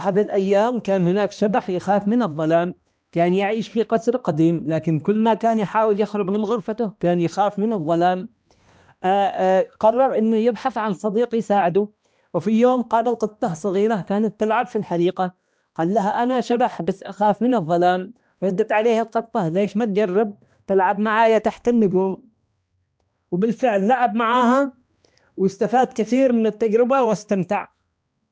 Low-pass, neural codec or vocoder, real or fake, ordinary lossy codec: none; codec, 16 kHz, 1 kbps, X-Codec, HuBERT features, trained on balanced general audio; fake; none